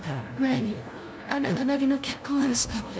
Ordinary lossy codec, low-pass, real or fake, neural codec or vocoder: none; none; fake; codec, 16 kHz, 0.5 kbps, FunCodec, trained on LibriTTS, 25 frames a second